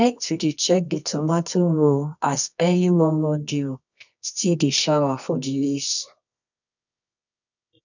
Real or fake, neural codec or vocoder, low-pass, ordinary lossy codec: fake; codec, 24 kHz, 0.9 kbps, WavTokenizer, medium music audio release; 7.2 kHz; none